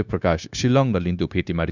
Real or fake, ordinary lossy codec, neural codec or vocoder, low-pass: fake; none; codec, 16 kHz, 0.9 kbps, LongCat-Audio-Codec; 7.2 kHz